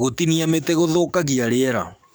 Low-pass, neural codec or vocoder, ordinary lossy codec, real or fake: none; codec, 44.1 kHz, 7.8 kbps, DAC; none; fake